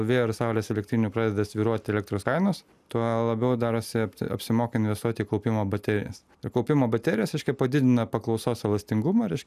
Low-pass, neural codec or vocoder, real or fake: 14.4 kHz; none; real